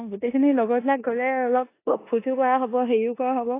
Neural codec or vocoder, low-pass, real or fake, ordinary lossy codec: codec, 16 kHz in and 24 kHz out, 0.9 kbps, LongCat-Audio-Codec, four codebook decoder; 3.6 kHz; fake; AAC, 24 kbps